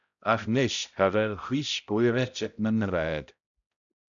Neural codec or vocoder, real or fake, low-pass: codec, 16 kHz, 0.5 kbps, X-Codec, HuBERT features, trained on balanced general audio; fake; 7.2 kHz